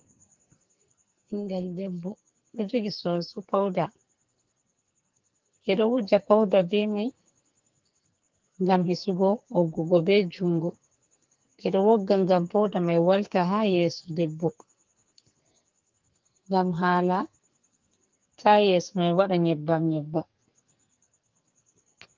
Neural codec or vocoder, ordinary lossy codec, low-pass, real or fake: codec, 44.1 kHz, 2.6 kbps, SNAC; Opus, 32 kbps; 7.2 kHz; fake